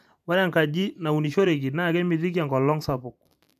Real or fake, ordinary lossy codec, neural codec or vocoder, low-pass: fake; none; vocoder, 44.1 kHz, 128 mel bands every 512 samples, BigVGAN v2; 14.4 kHz